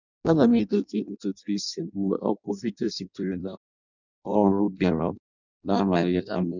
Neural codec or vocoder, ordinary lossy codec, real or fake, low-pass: codec, 16 kHz in and 24 kHz out, 0.6 kbps, FireRedTTS-2 codec; none; fake; 7.2 kHz